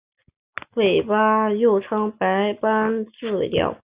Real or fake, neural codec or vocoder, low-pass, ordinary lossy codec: real; none; 3.6 kHz; Opus, 64 kbps